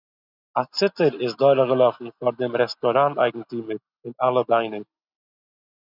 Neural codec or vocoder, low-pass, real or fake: none; 5.4 kHz; real